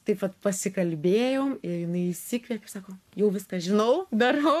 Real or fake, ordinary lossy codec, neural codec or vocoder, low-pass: fake; MP3, 96 kbps; codec, 44.1 kHz, 7.8 kbps, Pupu-Codec; 14.4 kHz